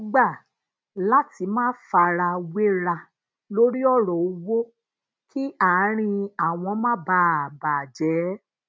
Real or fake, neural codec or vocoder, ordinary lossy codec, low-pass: real; none; none; none